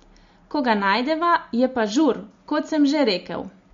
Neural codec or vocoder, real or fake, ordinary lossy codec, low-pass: none; real; MP3, 48 kbps; 7.2 kHz